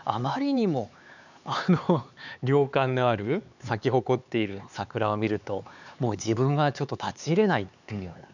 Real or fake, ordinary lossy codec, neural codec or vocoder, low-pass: fake; none; codec, 16 kHz, 4 kbps, X-Codec, HuBERT features, trained on LibriSpeech; 7.2 kHz